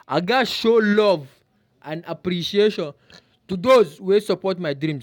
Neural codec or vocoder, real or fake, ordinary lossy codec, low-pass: none; real; none; 19.8 kHz